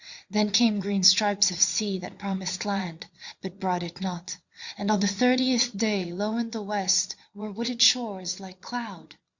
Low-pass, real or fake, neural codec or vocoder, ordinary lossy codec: 7.2 kHz; fake; vocoder, 22.05 kHz, 80 mel bands, Vocos; Opus, 64 kbps